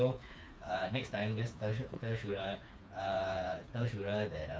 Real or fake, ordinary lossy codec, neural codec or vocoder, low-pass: fake; none; codec, 16 kHz, 4 kbps, FreqCodec, smaller model; none